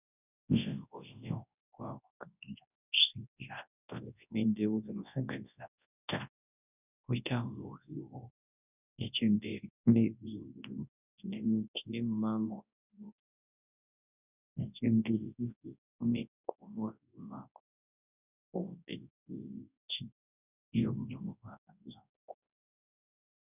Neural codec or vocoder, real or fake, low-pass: codec, 24 kHz, 0.9 kbps, WavTokenizer, large speech release; fake; 3.6 kHz